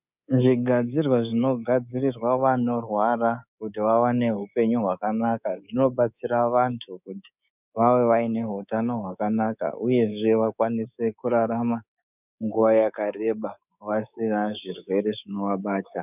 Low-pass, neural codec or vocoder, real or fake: 3.6 kHz; codec, 24 kHz, 3.1 kbps, DualCodec; fake